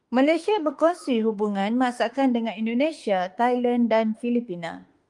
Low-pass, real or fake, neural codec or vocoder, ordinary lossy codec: 10.8 kHz; fake; autoencoder, 48 kHz, 32 numbers a frame, DAC-VAE, trained on Japanese speech; Opus, 32 kbps